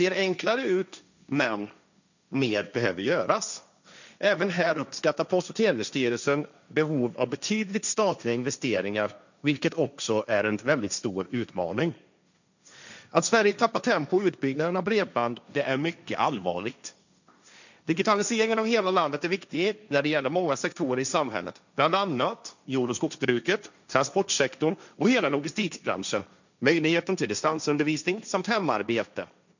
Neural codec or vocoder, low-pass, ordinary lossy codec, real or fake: codec, 16 kHz, 1.1 kbps, Voila-Tokenizer; 7.2 kHz; none; fake